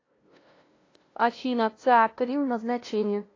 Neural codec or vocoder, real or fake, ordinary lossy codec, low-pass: codec, 16 kHz, 0.5 kbps, FunCodec, trained on LibriTTS, 25 frames a second; fake; AAC, 32 kbps; 7.2 kHz